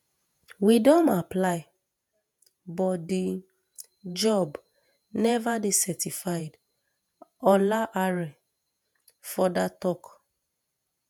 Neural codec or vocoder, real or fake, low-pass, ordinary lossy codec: vocoder, 48 kHz, 128 mel bands, Vocos; fake; none; none